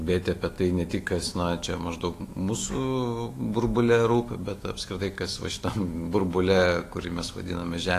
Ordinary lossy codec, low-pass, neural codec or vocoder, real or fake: AAC, 48 kbps; 14.4 kHz; autoencoder, 48 kHz, 128 numbers a frame, DAC-VAE, trained on Japanese speech; fake